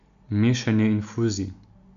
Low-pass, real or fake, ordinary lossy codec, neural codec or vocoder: 7.2 kHz; real; none; none